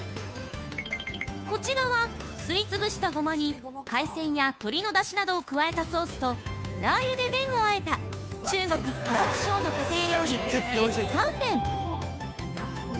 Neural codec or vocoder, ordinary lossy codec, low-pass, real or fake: codec, 16 kHz, 2 kbps, FunCodec, trained on Chinese and English, 25 frames a second; none; none; fake